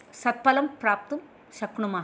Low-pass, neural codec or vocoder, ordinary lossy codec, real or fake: none; none; none; real